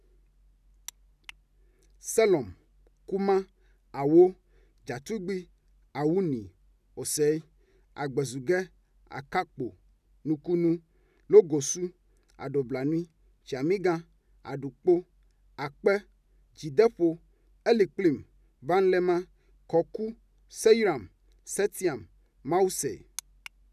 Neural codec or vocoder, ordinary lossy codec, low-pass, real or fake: none; none; 14.4 kHz; real